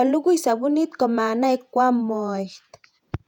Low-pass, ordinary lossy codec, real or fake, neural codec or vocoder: 19.8 kHz; none; fake; vocoder, 48 kHz, 128 mel bands, Vocos